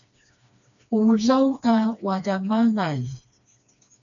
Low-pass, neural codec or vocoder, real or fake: 7.2 kHz; codec, 16 kHz, 2 kbps, FreqCodec, smaller model; fake